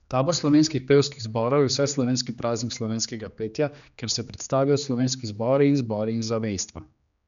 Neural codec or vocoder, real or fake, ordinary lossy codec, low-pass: codec, 16 kHz, 2 kbps, X-Codec, HuBERT features, trained on general audio; fake; none; 7.2 kHz